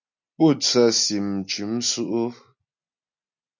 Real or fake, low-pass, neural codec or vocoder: real; 7.2 kHz; none